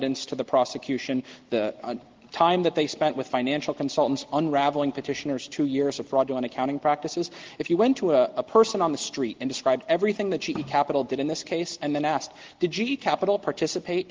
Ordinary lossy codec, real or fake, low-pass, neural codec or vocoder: Opus, 16 kbps; real; 7.2 kHz; none